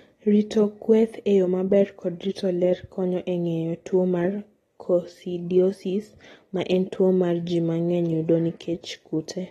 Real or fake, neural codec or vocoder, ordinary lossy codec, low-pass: real; none; AAC, 32 kbps; 19.8 kHz